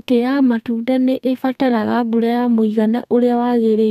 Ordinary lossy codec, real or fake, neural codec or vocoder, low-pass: none; fake; codec, 32 kHz, 1.9 kbps, SNAC; 14.4 kHz